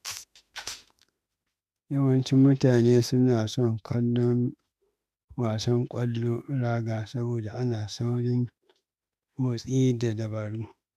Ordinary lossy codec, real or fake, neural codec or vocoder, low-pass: none; fake; autoencoder, 48 kHz, 32 numbers a frame, DAC-VAE, trained on Japanese speech; 14.4 kHz